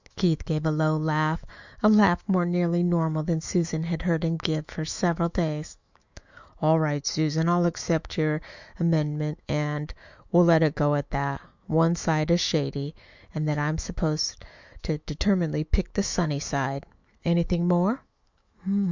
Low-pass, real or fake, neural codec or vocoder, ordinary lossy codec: 7.2 kHz; fake; autoencoder, 48 kHz, 128 numbers a frame, DAC-VAE, trained on Japanese speech; Opus, 64 kbps